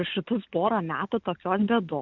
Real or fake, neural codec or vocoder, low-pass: real; none; 7.2 kHz